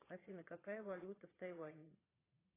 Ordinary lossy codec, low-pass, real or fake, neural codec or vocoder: AAC, 16 kbps; 3.6 kHz; fake; vocoder, 22.05 kHz, 80 mel bands, Vocos